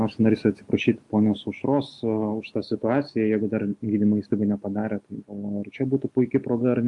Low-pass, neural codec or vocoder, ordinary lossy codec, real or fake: 10.8 kHz; none; MP3, 64 kbps; real